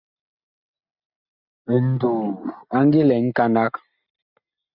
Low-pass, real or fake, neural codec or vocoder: 5.4 kHz; real; none